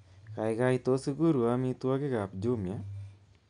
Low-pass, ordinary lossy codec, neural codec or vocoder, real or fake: 9.9 kHz; none; none; real